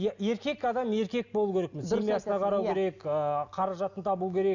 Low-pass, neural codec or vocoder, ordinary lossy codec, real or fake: 7.2 kHz; none; none; real